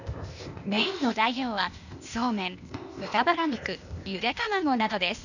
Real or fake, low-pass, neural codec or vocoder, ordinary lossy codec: fake; 7.2 kHz; codec, 16 kHz, 0.8 kbps, ZipCodec; none